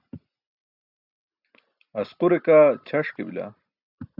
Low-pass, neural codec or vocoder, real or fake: 5.4 kHz; none; real